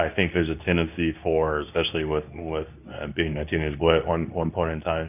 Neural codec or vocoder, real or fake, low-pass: codec, 24 kHz, 0.9 kbps, WavTokenizer, medium speech release version 2; fake; 3.6 kHz